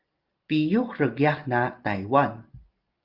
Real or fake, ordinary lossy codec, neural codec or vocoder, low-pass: real; Opus, 32 kbps; none; 5.4 kHz